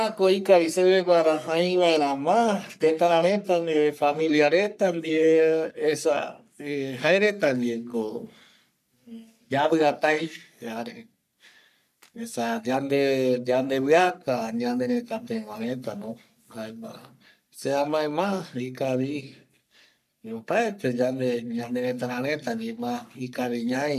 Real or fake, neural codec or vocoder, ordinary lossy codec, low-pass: fake; codec, 44.1 kHz, 3.4 kbps, Pupu-Codec; none; 14.4 kHz